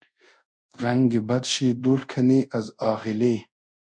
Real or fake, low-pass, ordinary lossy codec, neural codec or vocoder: fake; 9.9 kHz; MP3, 64 kbps; codec, 24 kHz, 0.5 kbps, DualCodec